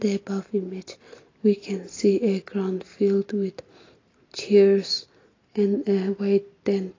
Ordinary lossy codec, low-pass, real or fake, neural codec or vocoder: AAC, 32 kbps; 7.2 kHz; real; none